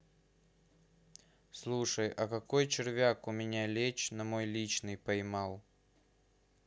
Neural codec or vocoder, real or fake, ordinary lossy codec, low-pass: none; real; none; none